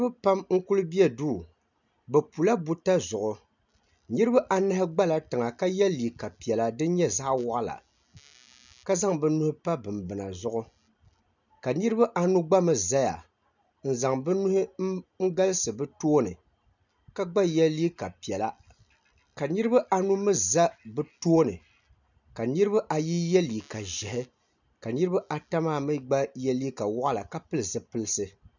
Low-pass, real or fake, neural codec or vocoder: 7.2 kHz; real; none